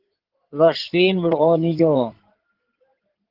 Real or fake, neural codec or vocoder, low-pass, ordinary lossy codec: fake; codec, 16 kHz in and 24 kHz out, 2.2 kbps, FireRedTTS-2 codec; 5.4 kHz; Opus, 16 kbps